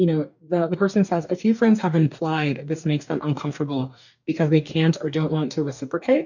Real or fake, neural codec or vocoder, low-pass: fake; codec, 44.1 kHz, 2.6 kbps, DAC; 7.2 kHz